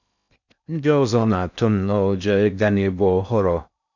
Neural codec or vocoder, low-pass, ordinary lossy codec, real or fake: codec, 16 kHz in and 24 kHz out, 0.6 kbps, FocalCodec, streaming, 2048 codes; 7.2 kHz; none; fake